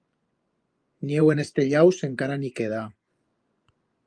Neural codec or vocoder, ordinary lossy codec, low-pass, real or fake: vocoder, 24 kHz, 100 mel bands, Vocos; Opus, 32 kbps; 9.9 kHz; fake